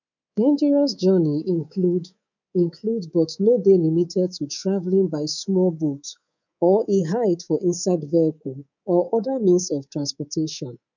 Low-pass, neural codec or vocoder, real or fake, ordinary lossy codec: 7.2 kHz; codec, 24 kHz, 3.1 kbps, DualCodec; fake; none